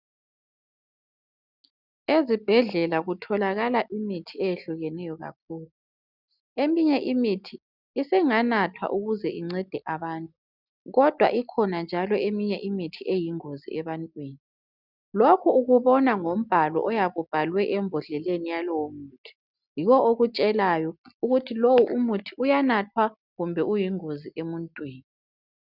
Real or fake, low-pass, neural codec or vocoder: real; 5.4 kHz; none